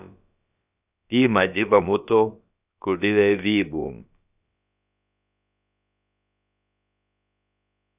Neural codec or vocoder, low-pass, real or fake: codec, 16 kHz, about 1 kbps, DyCAST, with the encoder's durations; 3.6 kHz; fake